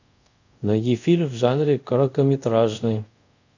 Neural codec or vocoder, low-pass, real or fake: codec, 24 kHz, 0.5 kbps, DualCodec; 7.2 kHz; fake